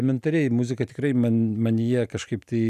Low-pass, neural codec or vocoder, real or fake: 14.4 kHz; none; real